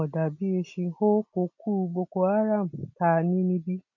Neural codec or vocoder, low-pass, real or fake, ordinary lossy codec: none; 7.2 kHz; real; none